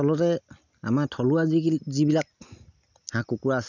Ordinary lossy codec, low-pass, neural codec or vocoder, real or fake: none; 7.2 kHz; none; real